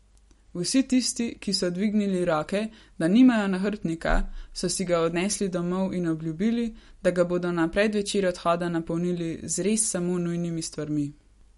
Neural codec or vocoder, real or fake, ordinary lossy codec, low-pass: none; real; MP3, 48 kbps; 19.8 kHz